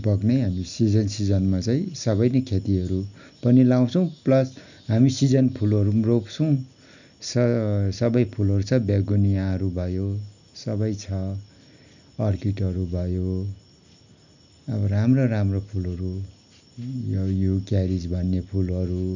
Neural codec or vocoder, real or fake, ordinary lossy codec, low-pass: none; real; none; 7.2 kHz